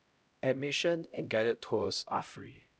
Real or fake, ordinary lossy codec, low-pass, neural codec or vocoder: fake; none; none; codec, 16 kHz, 0.5 kbps, X-Codec, HuBERT features, trained on LibriSpeech